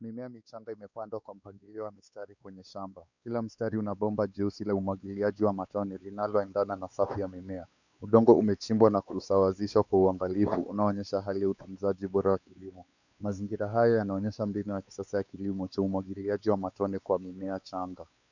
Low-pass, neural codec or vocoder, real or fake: 7.2 kHz; codec, 16 kHz, 4 kbps, X-Codec, WavLM features, trained on Multilingual LibriSpeech; fake